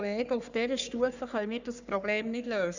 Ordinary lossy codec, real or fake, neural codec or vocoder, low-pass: none; fake; codec, 44.1 kHz, 3.4 kbps, Pupu-Codec; 7.2 kHz